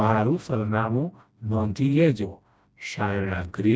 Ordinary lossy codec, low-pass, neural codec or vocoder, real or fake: none; none; codec, 16 kHz, 1 kbps, FreqCodec, smaller model; fake